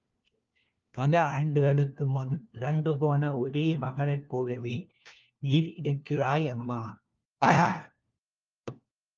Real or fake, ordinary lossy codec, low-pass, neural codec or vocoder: fake; Opus, 32 kbps; 7.2 kHz; codec, 16 kHz, 1 kbps, FunCodec, trained on LibriTTS, 50 frames a second